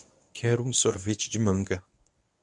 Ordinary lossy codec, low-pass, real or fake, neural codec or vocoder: MP3, 96 kbps; 10.8 kHz; fake; codec, 24 kHz, 0.9 kbps, WavTokenizer, medium speech release version 1